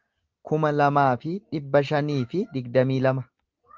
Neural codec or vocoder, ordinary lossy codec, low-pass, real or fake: none; Opus, 32 kbps; 7.2 kHz; real